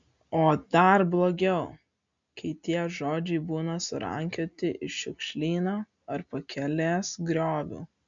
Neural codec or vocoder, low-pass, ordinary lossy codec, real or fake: none; 7.2 kHz; MP3, 64 kbps; real